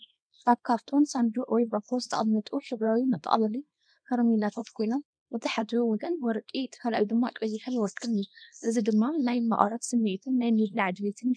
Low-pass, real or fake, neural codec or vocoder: 9.9 kHz; fake; codec, 24 kHz, 0.9 kbps, WavTokenizer, small release